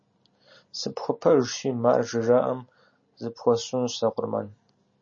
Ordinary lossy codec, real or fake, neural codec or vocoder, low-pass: MP3, 32 kbps; real; none; 7.2 kHz